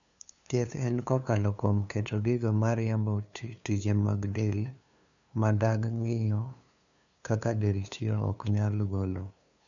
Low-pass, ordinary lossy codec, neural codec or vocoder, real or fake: 7.2 kHz; none; codec, 16 kHz, 2 kbps, FunCodec, trained on LibriTTS, 25 frames a second; fake